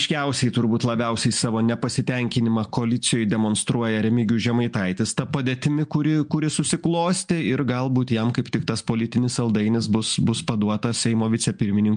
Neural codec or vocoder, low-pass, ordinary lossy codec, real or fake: none; 9.9 kHz; MP3, 64 kbps; real